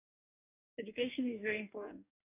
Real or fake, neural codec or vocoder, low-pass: fake; codec, 44.1 kHz, 2.6 kbps, DAC; 3.6 kHz